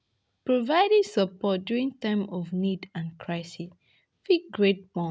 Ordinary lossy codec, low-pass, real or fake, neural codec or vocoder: none; none; real; none